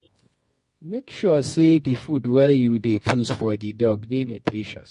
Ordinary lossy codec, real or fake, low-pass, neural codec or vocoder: MP3, 48 kbps; fake; 10.8 kHz; codec, 24 kHz, 0.9 kbps, WavTokenizer, medium music audio release